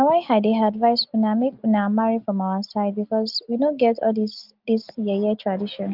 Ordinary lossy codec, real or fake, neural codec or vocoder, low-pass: Opus, 32 kbps; real; none; 5.4 kHz